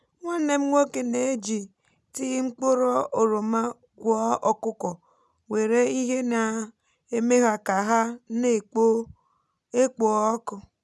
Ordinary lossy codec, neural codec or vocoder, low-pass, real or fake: none; none; none; real